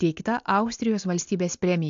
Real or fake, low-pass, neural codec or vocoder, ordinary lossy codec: real; 7.2 kHz; none; AAC, 64 kbps